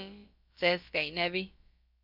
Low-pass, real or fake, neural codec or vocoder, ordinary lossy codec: 5.4 kHz; fake; codec, 16 kHz, about 1 kbps, DyCAST, with the encoder's durations; MP3, 48 kbps